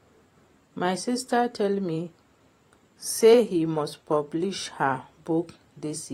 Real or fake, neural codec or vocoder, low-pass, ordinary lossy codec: fake; vocoder, 44.1 kHz, 128 mel bands every 512 samples, BigVGAN v2; 19.8 kHz; AAC, 48 kbps